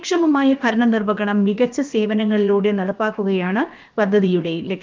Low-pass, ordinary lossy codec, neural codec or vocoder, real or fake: 7.2 kHz; Opus, 24 kbps; codec, 16 kHz, about 1 kbps, DyCAST, with the encoder's durations; fake